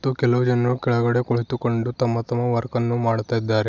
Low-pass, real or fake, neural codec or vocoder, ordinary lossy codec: 7.2 kHz; real; none; none